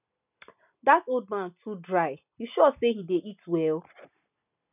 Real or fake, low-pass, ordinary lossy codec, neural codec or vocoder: real; 3.6 kHz; none; none